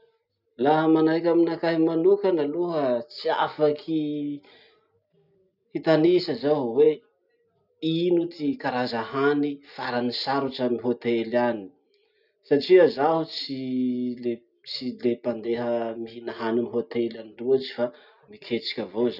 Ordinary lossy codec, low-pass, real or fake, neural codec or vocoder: none; 5.4 kHz; real; none